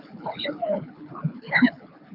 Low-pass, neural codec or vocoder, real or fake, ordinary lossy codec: 5.4 kHz; codec, 24 kHz, 6 kbps, HILCodec; fake; AAC, 48 kbps